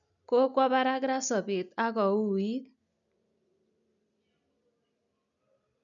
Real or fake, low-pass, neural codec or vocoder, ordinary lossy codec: real; 7.2 kHz; none; none